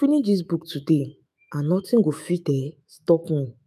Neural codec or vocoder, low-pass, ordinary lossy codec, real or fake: autoencoder, 48 kHz, 128 numbers a frame, DAC-VAE, trained on Japanese speech; 14.4 kHz; none; fake